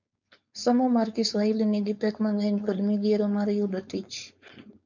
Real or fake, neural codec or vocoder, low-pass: fake; codec, 16 kHz, 4.8 kbps, FACodec; 7.2 kHz